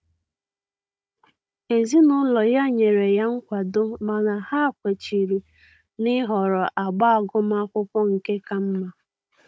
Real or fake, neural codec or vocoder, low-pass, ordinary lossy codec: fake; codec, 16 kHz, 16 kbps, FunCodec, trained on Chinese and English, 50 frames a second; none; none